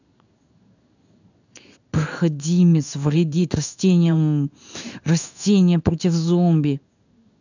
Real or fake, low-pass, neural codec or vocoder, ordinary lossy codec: fake; 7.2 kHz; codec, 16 kHz in and 24 kHz out, 1 kbps, XY-Tokenizer; none